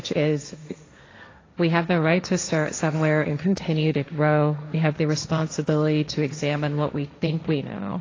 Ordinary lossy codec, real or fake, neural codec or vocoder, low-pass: AAC, 32 kbps; fake; codec, 16 kHz, 1.1 kbps, Voila-Tokenizer; 7.2 kHz